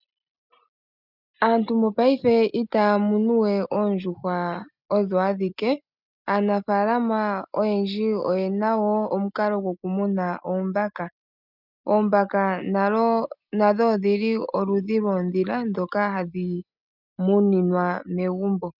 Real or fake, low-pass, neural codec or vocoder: real; 5.4 kHz; none